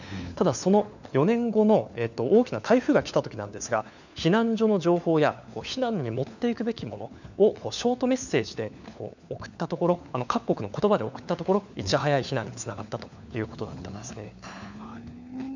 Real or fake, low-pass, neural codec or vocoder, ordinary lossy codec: fake; 7.2 kHz; codec, 16 kHz, 4 kbps, FunCodec, trained on LibriTTS, 50 frames a second; none